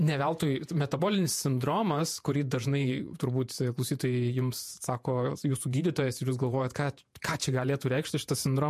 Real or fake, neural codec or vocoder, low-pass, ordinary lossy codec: fake; vocoder, 48 kHz, 128 mel bands, Vocos; 14.4 kHz; MP3, 64 kbps